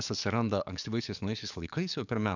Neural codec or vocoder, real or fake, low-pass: codec, 44.1 kHz, 7.8 kbps, DAC; fake; 7.2 kHz